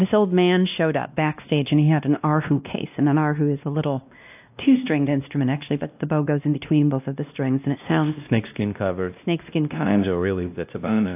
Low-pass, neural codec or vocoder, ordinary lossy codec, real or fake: 3.6 kHz; codec, 16 kHz, 1 kbps, X-Codec, WavLM features, trained on Multilingual LibriSpeech; AAC, 32 kbps; fake